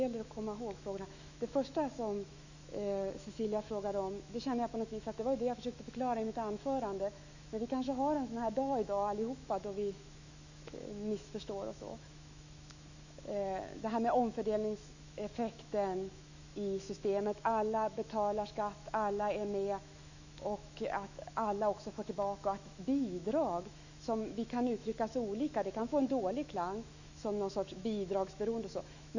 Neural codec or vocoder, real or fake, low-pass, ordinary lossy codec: autoencoder, 48 kHz, 128 numbers a frame, DAC-VAE, trained on Japanese speech; fake; 7.2 kHz; none